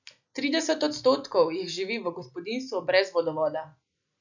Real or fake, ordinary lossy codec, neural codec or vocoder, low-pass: real; none; none; 7.2 kHz